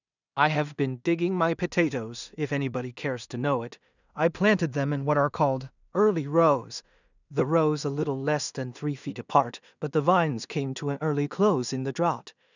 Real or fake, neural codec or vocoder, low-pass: fake; codec, 16 kHz in and 24 kHz out, 0.4 kbps, LongCat-Audio-Codec, two codebook decoder; 7.2 kHz